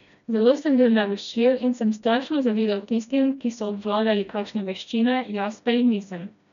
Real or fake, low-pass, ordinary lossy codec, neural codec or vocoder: fake; 7.2 kHz; none; codec, 16 kHz, 1 kbps, FreqCodec, smaller model